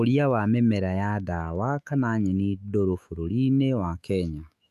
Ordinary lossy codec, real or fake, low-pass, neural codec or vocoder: AAC, 96 kbps; fake; 14.4 kHz; autoencoder, 48 kHz, 128 numbers a frame, DAC-VAE, trained on Japanese speech